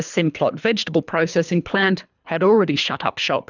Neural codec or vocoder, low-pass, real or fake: codec, 24 kHz, 3 kbps, HILCodec; 7.2 kHz; fake